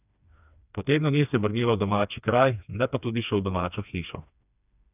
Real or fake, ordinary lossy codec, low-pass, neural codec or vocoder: fake; none; 3.6 kHz; codec, 16 kHz, 2 kbps, FreqCodec, smaller model